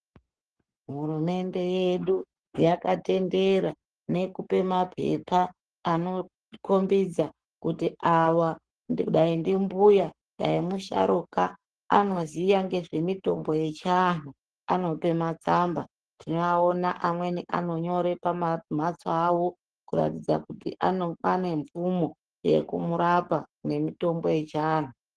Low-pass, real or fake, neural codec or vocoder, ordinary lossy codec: 10.8 kHz; fake; codec, 44.1 kHz, 7.8 kbps, Pupu-Codec; Opus, 16 kbps